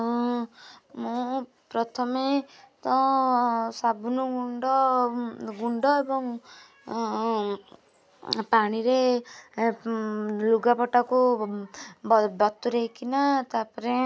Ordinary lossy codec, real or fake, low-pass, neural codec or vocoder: none; real; none; none